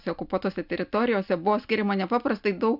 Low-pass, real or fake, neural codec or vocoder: 5.4 kHz; real; none